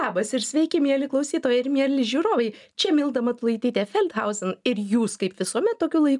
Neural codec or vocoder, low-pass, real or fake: none; 10.8 kHz; real